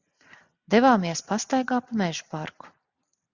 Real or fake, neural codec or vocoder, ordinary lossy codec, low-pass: real; none; Opus, 64 kbps; 7.2 kHz